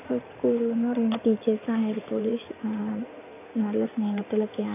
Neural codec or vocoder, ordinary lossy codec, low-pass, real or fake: codec, 16 kHz in and 24 kHz out, 2.2 kbps, FireRedTTS-2 codec; none; 3.6 kHz; fake